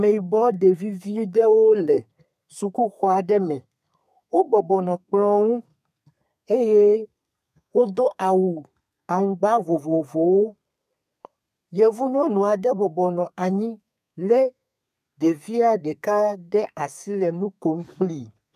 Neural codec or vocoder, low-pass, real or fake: codec, 32 kHz, 1.9 kbps, SNAC; 14.4 kHz; fake